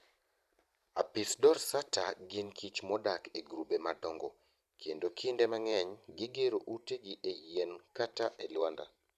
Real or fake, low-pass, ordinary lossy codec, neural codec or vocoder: fake; 14.4 kHz; none; vocoder, 44.1 kHz, 128 mel bands every 512 samples, BigVGAN v2